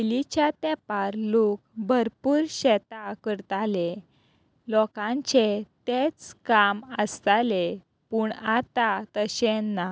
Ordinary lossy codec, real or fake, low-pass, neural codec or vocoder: none; real; none; none